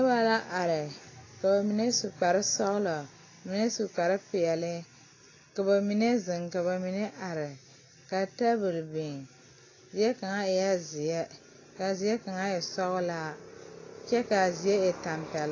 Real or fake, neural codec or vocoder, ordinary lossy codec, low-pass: real; none; AAC, 32 kbps; 7.2 kHz